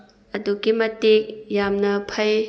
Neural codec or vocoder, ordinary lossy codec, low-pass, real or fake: none; none; none; real